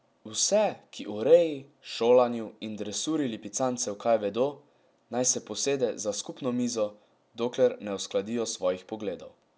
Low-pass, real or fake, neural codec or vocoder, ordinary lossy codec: none; real; none; none